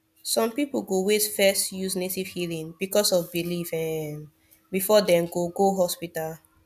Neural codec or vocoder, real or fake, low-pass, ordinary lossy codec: none; real; 14.4 kHz; none